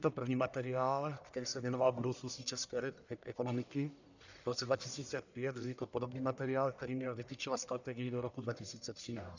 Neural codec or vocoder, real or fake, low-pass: codec, 44.1 kHz, 1.7 kbps, Pupu-Codec; fake; 7.2 kHz